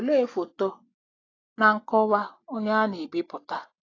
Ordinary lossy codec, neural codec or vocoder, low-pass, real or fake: AAC, 32 kbps; none; 7.2 kHz; real